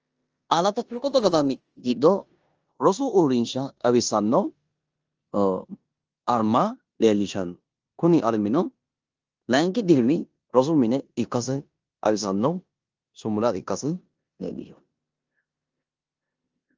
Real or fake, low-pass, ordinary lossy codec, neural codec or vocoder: fake; 7.2 kHz; Opus, 24 kbps; codec, 16 kHz in and 24 kHz out, 0.9 kbps, LongCat-Audio-Codec, four codebook decoder